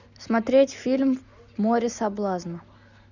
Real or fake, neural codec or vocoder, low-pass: real; none; 7.2 kHz